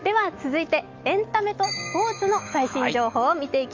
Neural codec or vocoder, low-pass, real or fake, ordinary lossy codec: autoencoder, 48 kHz, 128 numbers a frame, DAC-VAE, trained on Japanese speech; 7.2 kHz; fake; Opus, 32 kbps